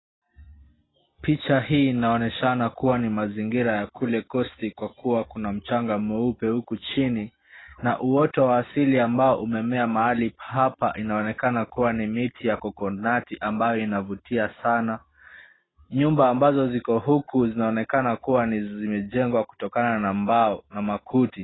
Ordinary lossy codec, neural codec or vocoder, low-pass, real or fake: AAC, 16 kbps; none; 7.2 kHz; real